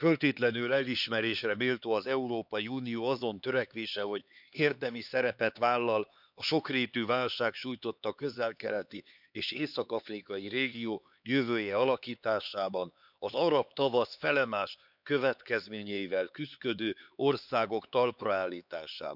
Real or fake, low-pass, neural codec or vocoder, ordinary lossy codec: fake; 5.4 kHz; codec, 16 kHz, 4 kbps, X-Codec, HuBERT features, trained on LibriSpeech; none